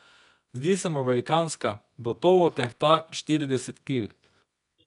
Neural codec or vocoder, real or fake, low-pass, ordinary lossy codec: codec, 24 kHz, 0.9 kbps, WavTokenizer, medium music audio release; fake; 10.8 kHz; none